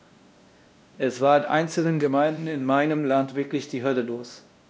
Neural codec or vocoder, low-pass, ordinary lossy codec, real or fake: codec, 16 kHz, 1 kbps, X-Codec, WavLM features, trained on Multilingual LibriSpeech; none; none; fake